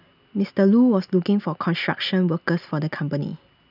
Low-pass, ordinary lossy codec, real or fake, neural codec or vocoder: 5.4 kHz; none; real; none